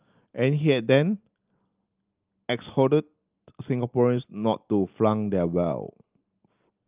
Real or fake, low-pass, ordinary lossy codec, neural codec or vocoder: real; 3.6 kHz; Opus, 24 kbps; none